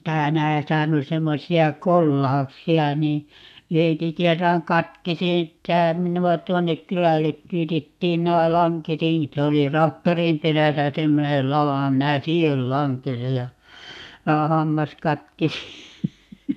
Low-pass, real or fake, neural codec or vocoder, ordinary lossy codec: 14.4 kHz; fake; codec, 32 kHz, 1.9 kbps, SNAC; none